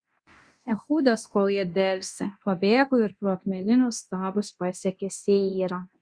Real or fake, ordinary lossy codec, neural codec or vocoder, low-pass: fake; Opus, 64 kbps; codec, 24 kHz, 0.9 kbps, DualCodec; 9.9 kHz